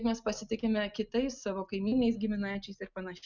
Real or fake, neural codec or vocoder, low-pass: real; none; 7.2 kHz